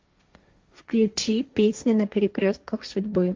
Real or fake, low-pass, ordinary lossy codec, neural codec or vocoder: fake; 7.2 kHz; Opus, 32 kbps; codec, 16 kHz, 1.1 kbps, Voila-Tokenizer